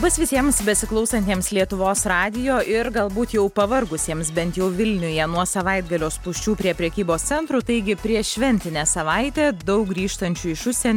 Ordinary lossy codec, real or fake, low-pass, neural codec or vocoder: MP3, 96 kbps; real; 19.8 kHz; none